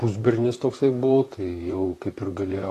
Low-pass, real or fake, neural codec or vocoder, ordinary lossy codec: 14.4 kHz; fake; vocoder, 44.1 kHz, 128 mel bands, Pupu-Vocoder; AAC, 48 kbps